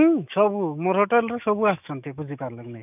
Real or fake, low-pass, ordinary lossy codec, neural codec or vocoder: fake; 3.6 kHz; none; autoencoder, 48 kHz, 128 numbers a frame, DAC-VAE, trained on Japanese speech